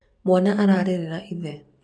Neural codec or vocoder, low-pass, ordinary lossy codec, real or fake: vocoder, 44.1 kHz, 128 mel bands every 512 samples, BigVGAN v2; 9.9 kHz; none; fake